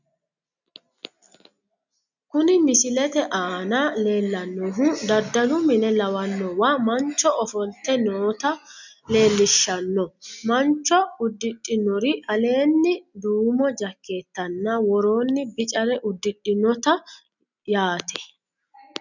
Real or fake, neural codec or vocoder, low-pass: real; none; 7.2 kHz